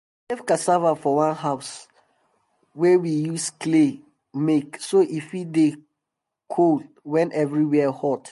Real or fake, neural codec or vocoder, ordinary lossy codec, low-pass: real; none; MP3, 48 kbps; 14.4 kHz